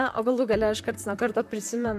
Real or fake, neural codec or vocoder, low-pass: fake; vocoder, 44.1 kHz, 128 mel bands, Pupu-Vocoder; 14.4 kHz